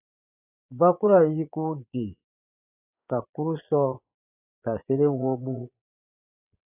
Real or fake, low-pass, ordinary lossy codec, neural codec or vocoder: fake; 3.6 kHz; AAC, 32 kbps; vocoder, 22.05 kHz, 80 mel bands, Vocos